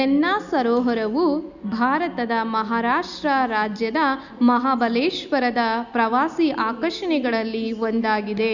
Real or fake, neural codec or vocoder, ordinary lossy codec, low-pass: real; none; none; 7.2 kHz